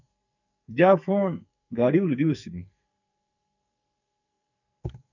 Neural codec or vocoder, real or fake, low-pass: codec, 44.1 kHz, 2.6 kbps, SNAC; fake; 7.2 kHz